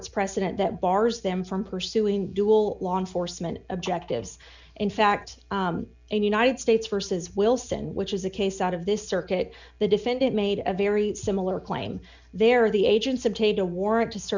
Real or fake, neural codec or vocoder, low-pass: real; none; 7.2 kHz